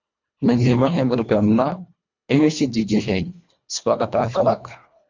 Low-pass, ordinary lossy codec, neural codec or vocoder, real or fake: 7.2 kHz; MP3, 64 kbps; codec, 24 kHz, 1.5 kbps, HILCodec; fake